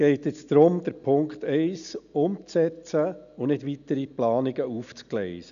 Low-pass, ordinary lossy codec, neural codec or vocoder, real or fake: 7.2 kHz; none; none; real